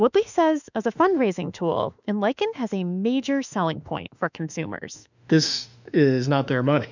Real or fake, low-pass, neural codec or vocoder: fake; 7.2 kHz; autoencoder, 48 kHz, 32 numbers a frame, DAC-VAE, trained on Japanese speech